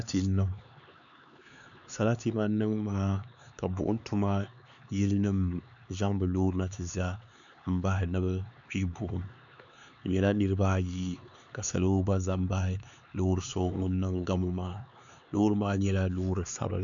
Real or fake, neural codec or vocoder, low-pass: fake; codec, 16 kHz, 4 kbps, X-Codec, HuBERT features, trained on LibriSpeech; 7.2 kHz